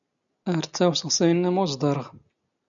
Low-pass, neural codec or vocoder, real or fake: 7.2 kHz; none; real